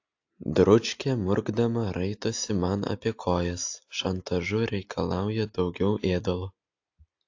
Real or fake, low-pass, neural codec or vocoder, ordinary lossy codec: real; 7.2 kHz; none; AAC, 48 kbps